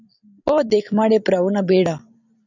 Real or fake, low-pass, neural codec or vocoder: fake; 7.2 kHz; vocoder, 44.1 kHz, 128 mel bands every 256 samples, BigVGAN v2